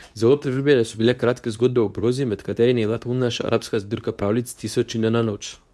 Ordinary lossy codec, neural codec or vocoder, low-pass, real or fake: none; codec, 24 kHz, 0.9 kbps, WavTokenizer, medium speech release version 2; none; fake